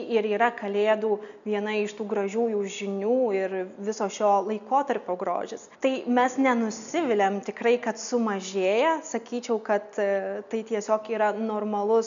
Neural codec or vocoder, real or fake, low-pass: none; real; 7.2 kHz